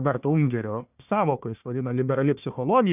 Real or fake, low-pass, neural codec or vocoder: fake; 3.6 kHz; codec, 16 kHz, 1 kbps, FunCodec, trained on Chinese and English, 50 frames a second